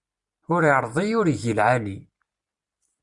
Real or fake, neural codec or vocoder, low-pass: real; none; 10.8 kHz